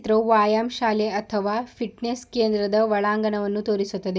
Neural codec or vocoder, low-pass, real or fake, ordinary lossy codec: none; none; real; none